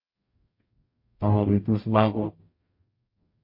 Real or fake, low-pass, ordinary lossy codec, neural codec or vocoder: fake; 5.4 kHz; MP3, 32 kbps; codec, 44.1 kHz, 0.9 kbps, DAC